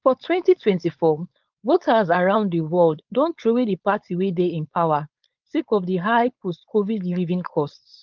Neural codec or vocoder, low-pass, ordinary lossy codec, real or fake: codec, 16 kHz, 4.8 kbps, FACodec; 7.2 kHz; Opus, 24 kbps; fake